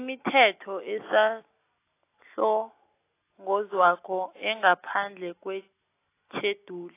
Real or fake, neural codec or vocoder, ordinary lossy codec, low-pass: real; none; AAC, 24 kbps; 3.6 kHz